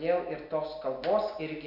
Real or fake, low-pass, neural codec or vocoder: real; 5.4 kHz; none